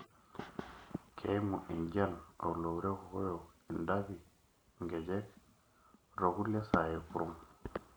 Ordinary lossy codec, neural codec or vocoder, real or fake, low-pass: none; none; real; none